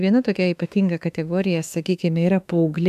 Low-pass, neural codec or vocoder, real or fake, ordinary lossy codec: 14.4 kHz; autoencoder, 48 kHz, 32 numbers a frame, DAC-VAE, trained on Japanese speech; fake; AAC, 96 kbps